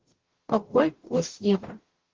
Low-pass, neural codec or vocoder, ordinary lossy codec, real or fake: 7.2 kHz; codec, 44.1 kHz, 0.9 kbps, DAC; Opus, 16 kbps; fake